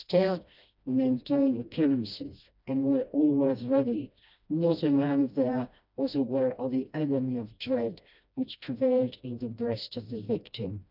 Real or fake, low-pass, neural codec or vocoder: fake; 5.4 kHz; codec, 16 kHz, 1 kbps, FreqCodec, smaller model